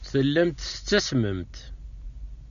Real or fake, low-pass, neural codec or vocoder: real; 7.2 kHz; none